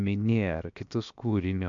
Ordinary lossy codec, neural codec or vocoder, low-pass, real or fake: MP3, 64 kbps; codec, 16 kHz, 0.7 kbps, FocalCodec; 7.2 kHz; fake